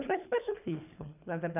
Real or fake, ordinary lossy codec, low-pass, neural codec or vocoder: fake; none; 3.6 kHz; codec, 24 kHz, 3 kbps, HILCodec